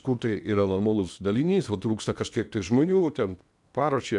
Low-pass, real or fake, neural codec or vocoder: 10.8 kHz; fake; codec, 16 kHz in and 24 kHz out, 0.8 kbps, FocalCodec, streaming, 65536 codes